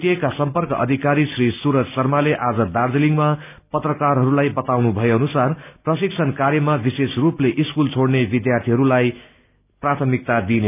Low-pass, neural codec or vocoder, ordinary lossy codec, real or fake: 3.6 kHz; none; none; real